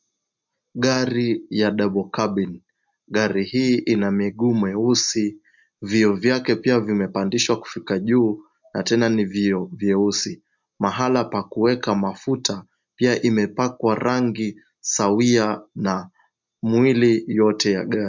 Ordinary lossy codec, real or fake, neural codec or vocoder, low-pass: MP3, 64 kbps; real; none; 7.2 kHz